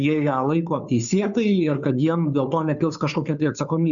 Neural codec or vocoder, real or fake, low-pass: codec, 16 kHz, 2 kbps, FunCodec, trained on Chinese and English, 25 frames a second; fake; 7.2 kHz